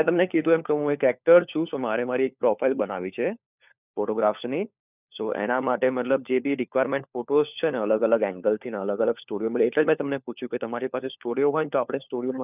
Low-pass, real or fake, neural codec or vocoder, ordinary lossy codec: 3.6 kHz; fake; codec, 16 kHz, 4 kbps, FunCodec, trained on LibriTTS, 50 frames a second; none